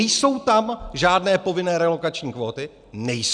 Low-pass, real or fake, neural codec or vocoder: 9.9 kHz; real; none